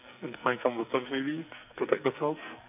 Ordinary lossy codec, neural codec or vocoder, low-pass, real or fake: none; codec, 44.1 kHz, 2.6 kbps, SNAC; 3.6 kHz; fake